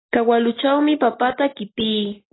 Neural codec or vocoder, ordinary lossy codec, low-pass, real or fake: none; AAC, 16 kbps; 7.2 kHz; real